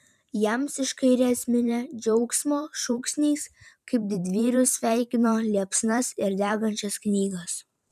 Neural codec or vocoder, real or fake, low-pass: vocoder, 44.1 kHz, 128 mel bands every 512 samples, BigVGAN v2; fake; 14.4 kHz